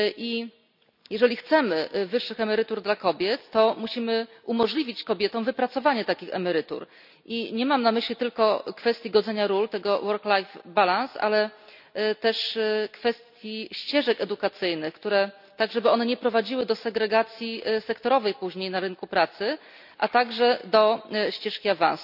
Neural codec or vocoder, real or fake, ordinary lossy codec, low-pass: none; real; none; 5.4 kHz